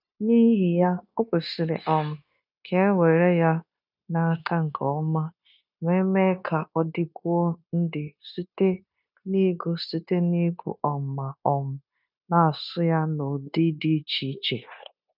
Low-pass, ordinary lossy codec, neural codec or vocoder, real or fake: 5.4 kHz; none; codec, 16 kHz, 0.9 kbps, LongCat-Audio-Codec; fake